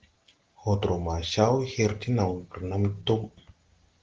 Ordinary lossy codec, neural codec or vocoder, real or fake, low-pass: Opus, 16 kbps; none; real; 7.2 kHz